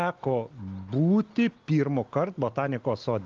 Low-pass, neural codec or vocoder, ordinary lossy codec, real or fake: 7.2 kHz; none; Opus, 32 kbps; real